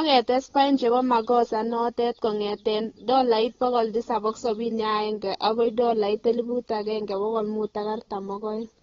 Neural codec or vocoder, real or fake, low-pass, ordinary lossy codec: codec, 16 kHz, 8 kbps, FunCodec, trained on Chinese and English, 25 frames a second; fake; 7.2 kHz; AAC, 24 kbps